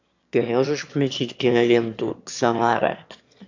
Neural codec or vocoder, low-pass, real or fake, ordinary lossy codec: autoencoder, 22.05 kHz, a latent of 192 numbers a frame, VITS, trained on one speaker; 7.2 kHz; fake; AAC, 48 kbps